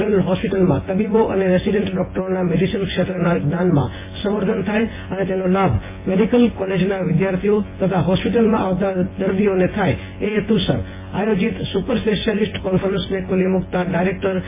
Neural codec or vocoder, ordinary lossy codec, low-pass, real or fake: vocoder, 24 kHz, 100 mel bands, Vocos; MP3, 16 kbps; 3.6 kHz; fake